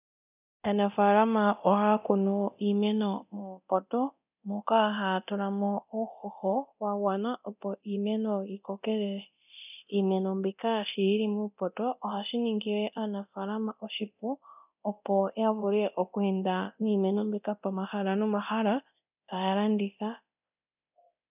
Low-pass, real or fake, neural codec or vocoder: 3.6 kHz; fake; codec, 24 kHz, 0.9 kbps, DualCodec